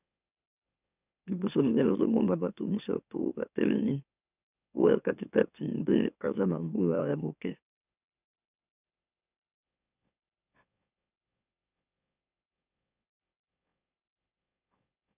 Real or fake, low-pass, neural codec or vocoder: fake; 3.6 kHz; autoencoder, 44.1 kHz, a latent of 192 numbers a frame, MeloTTS